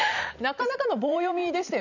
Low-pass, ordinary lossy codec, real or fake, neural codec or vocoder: 7.2 kHz; none; fake; vocoder, 44.1 kHz, 128 mel bands every 512 samples, BigVGAN v2